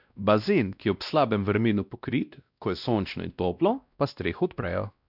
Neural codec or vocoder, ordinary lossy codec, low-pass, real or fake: codec, 16 kHz, 1 kbps, X-Codec, WavLM features, trained on Multilingual LibriSpeech; none; 5.4 kHz; fake